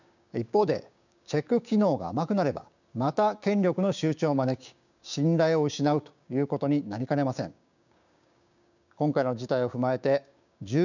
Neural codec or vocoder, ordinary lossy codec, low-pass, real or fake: codec, 16 kHz, 6 kbps, DAC; none; 7.2 kHz; fake